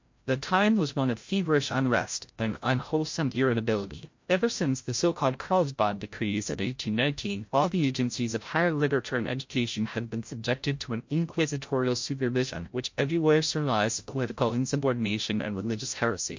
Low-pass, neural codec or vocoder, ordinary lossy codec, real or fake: 7.2 kHz; codec, 16 kHz, 0.5 kbps, FreqCodec, larger model; MP3, 48 kbps; fake